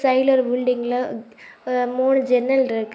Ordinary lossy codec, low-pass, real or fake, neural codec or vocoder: none; none; real; none